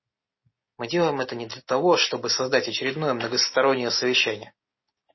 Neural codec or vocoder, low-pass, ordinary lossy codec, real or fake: none; 7.2 kHz; MP3, 24 kbps; real